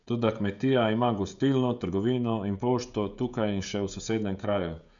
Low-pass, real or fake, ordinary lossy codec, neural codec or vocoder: 7.2 kHz; fake; none; codec, 16 kHz, 16 kbps, FreqCodec, smaller model